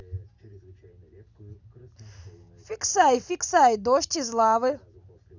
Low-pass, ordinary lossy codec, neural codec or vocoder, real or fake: 7.2 kHz; none; none; real